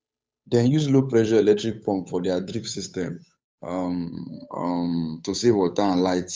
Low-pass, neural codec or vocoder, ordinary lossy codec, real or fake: none; codec, 16 kHz, 8 kbps, FunCodec, trained on Chinese and English, 25 frames a second; none; fake